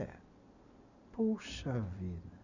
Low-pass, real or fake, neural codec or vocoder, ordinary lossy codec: 7.2 kHz; real; none; none